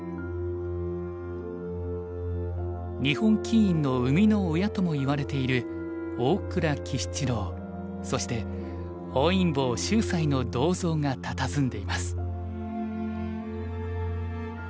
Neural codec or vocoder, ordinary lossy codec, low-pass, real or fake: none; none; none; real